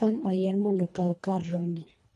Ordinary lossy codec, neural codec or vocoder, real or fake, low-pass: none; codec, 24 kHz, 1.5 kbps, HILCodec; fake; none